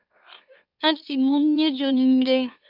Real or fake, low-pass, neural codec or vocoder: fake; 5.4 kHz; autoencoder, 44.1 kHz, a latent of 192 numbers a frame, MeloTTS